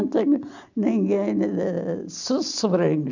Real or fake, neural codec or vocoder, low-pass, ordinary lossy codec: real; none; 7.2 kHz; none